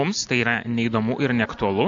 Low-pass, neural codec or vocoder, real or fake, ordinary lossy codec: 7.2 kHz; none; real; AAC, 64 kbps